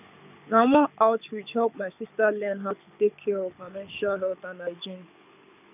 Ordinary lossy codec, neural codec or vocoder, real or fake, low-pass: none; codec, 24 kHz, 6 kbps, HILCodec; fake; 3.6 kHz